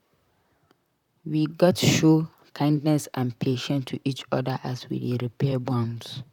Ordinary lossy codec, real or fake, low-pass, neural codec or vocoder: none; fake; 19.8 kHz; vocoder, 44.1 kHz, 128 mel bands, Pupu-Vocoder